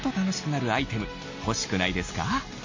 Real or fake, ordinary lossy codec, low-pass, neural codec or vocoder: real; MP3, 32 kbps; 7.2 kHz; none